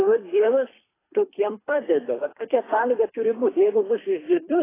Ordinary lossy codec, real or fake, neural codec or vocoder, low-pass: AAC, 16 kbps; fake; codec, 24 kHz, 3 kbps, HILCodec; 3.6 kHz